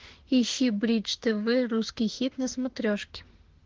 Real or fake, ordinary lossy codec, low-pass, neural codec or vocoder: fake; Opus, 16 kbps; 7.2 kHz; autoencoder, 48 kHz, 32 numbers a frame, DAC-VAE, trained on Japanese speech